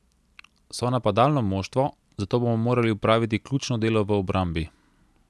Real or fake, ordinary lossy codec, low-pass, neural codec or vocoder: real; none; none; none